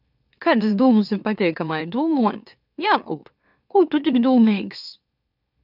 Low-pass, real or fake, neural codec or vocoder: 5.4 kHz; fake; autoencoder, 44.1 kHz, a latent of 192 numbers a frame, MeloTTS